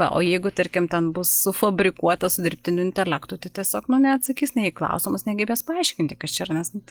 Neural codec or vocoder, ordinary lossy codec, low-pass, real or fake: none; Opus, 24 kbps; 19.8 kHz; real